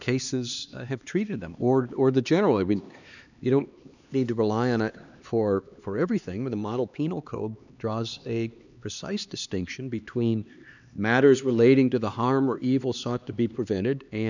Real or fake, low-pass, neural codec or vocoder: fake; 7.2 kHz; codec, 16 kHz, 2 kbps, X-Codec, HuBERT features, trained on LibriSpeech